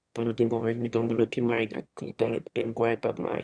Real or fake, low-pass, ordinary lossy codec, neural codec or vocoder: fake; 9.9 kHz; Opus, 64 kbps; autoencoder, 22.05 kHz, a latent of 192 numbers a frame, VITS, trained on one speaker